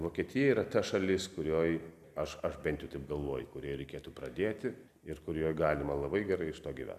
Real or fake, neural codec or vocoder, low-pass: real; none; 14.4 kHz